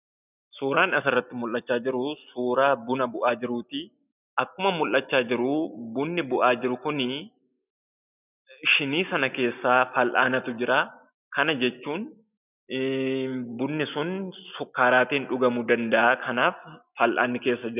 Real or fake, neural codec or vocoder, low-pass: real; none; 3.6 kHz